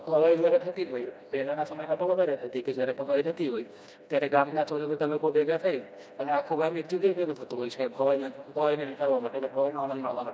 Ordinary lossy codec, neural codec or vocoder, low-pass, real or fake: none; codec, 16 kHz, 1 kbps, FreqCodec, smaller model; none; fake